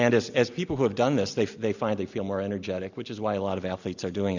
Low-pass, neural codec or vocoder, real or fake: 7.2 kHz; none; real